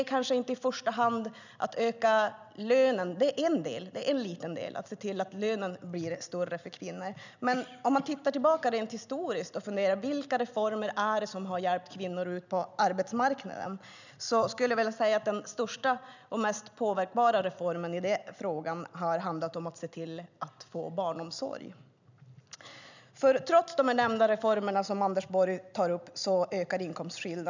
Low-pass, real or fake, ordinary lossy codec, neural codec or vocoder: 7.2 kHz; real; none; none